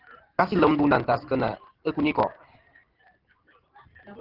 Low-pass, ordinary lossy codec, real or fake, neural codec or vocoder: 5.4 kHz; Opus, 16 kbps; real; none